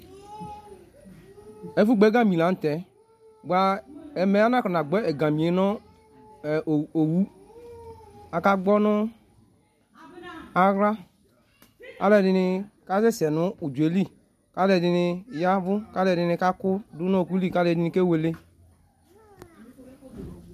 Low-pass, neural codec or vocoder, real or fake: 14.4 kHz; none; real